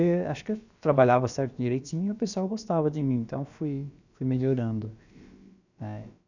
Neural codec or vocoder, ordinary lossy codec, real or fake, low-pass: codec, 16 kHz, about 1 kbps, DyCAST, with the encoder's durations; none; fake; 7.2 kHz